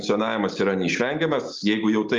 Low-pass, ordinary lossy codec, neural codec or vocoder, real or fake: 7.2 kHz; Opus, 32 kbps; none; real